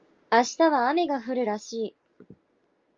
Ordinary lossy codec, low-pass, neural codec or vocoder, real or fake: Opus, 32 kbps; 7.2 kHz; none; real